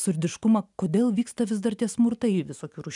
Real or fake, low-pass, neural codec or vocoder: real; 10.8 kHz; none